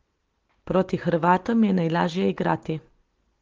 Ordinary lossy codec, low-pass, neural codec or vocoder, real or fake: Opus, 16 kbps; 7.2 kHz; none; real